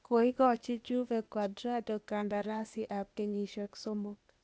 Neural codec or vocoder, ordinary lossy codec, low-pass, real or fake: codec, 16 kHz, 0.8 kbps, ZipCodec; none; none; fake